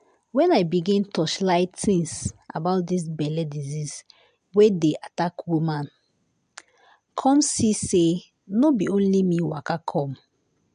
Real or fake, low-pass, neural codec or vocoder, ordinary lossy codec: real; 9.9 kHz; none; MP3, 64 kbps